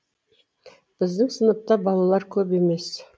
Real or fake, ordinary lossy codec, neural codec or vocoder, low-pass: real; none; none; none